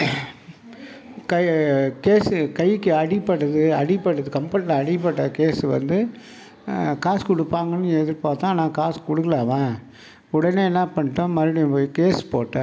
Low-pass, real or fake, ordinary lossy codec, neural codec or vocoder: none; real; none; none